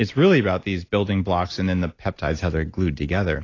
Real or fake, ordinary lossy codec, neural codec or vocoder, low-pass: real; AAC, 32 kbps; none; 7.2 kHz